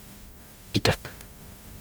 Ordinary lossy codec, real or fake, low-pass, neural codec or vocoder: none; fake; none; codec, 44.1 kHz, 0.9 kbps, DAC